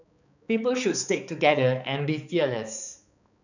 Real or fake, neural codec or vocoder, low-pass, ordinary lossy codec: fake; codec, 16 kHz, 4 kbps, X-Codec, HuBERT features, trained on balanced general audio; 7.2 kHz; none